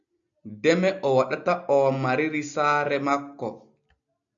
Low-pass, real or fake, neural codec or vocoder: 7.2 kHz; real; none